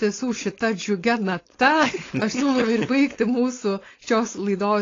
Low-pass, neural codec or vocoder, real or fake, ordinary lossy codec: 7.2 kHz; codec, 16 kHz, 4.8 kbps, FACodec; fake; AAC, 32 kbps